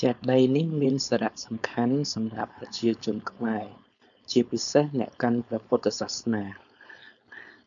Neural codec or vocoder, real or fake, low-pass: codec, 16 kHz, 4.8 kbps, FACodec; fake; 7.2 kHz